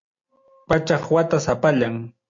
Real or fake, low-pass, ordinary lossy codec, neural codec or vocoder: real; 7.2 kHz; MP3, 48 kbps; none